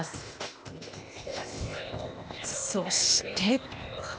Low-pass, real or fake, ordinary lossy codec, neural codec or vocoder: none; fake; none; codec, 16 kHz, 0.8 kbps, ZipCodec